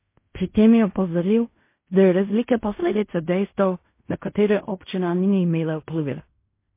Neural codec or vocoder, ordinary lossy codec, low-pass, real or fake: codec, 16 kHz in and 24 kHz out, 0.4 kbps, LongCat-Audio-Codec, fine tuned four codebook decoder; MP3, 24 kbps; 3.6 kHz; fake